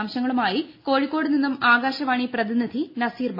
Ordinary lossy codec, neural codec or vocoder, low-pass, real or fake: MP3, 24 kbps; none; 5.4 kHz; real